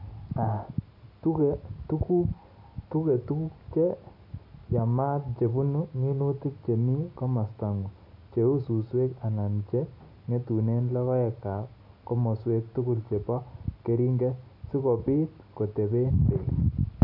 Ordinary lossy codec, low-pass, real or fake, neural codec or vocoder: none; 5.4 kHz; real; none